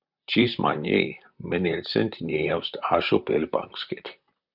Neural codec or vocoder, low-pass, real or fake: vocoder, 44.1 kHz, 128 mel bands, Pupu-Vocoder; 5.4 kHz; fake